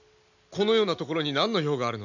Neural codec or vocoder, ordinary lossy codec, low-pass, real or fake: none; none; 7.2 kHz; real